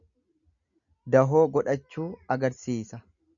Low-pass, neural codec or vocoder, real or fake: 7.2 kHz; none; real